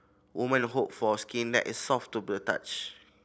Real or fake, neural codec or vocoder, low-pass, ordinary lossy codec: real; none; none; none